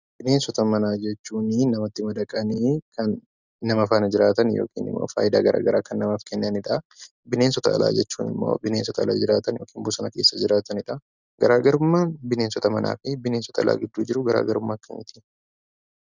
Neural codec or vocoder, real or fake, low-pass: none; real; 7.2 kHz